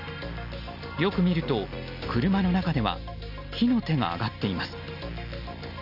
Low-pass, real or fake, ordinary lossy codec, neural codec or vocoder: 5.4 kHz; real; none; none